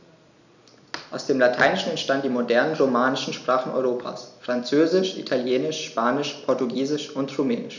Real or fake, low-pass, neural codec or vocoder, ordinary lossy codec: real; 7.2 kHz; none; none